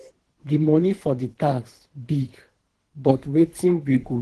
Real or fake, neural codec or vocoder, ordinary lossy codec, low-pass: fake; codec, 24 kHz, 3 kbps, HILCodec; Opus, 16 kbps; 10.8 kHz